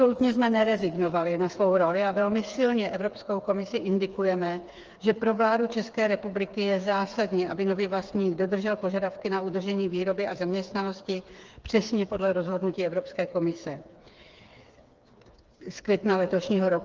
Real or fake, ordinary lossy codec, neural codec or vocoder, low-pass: fake; Opus, 24 kbps; codec, 16 kHz, 4 kbps, FreqCodec, smaller model; 7.2 kHz